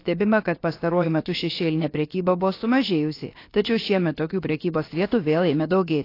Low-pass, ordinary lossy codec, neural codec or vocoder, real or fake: 5.4 kHz; AAC, 32 kbps; codec, 16 kHz, about 1 kbps, DyCAST, with the encoder's durations; fake